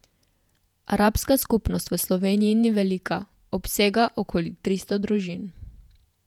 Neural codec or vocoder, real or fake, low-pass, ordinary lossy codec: vocoder, 48 kHz, 128 mel bands, Vocos; fake; 19.8 kHz; none